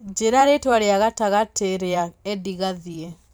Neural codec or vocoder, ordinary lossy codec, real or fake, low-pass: vocoder, 44.1 kHz, 128 mel bands every 512 samples, BigVGAN v2; none; fake; none